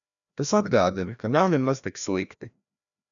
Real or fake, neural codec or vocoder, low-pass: fake; codec, 16 kHz, 1 kbps, FreqCodec, larger model; 7.2 kHz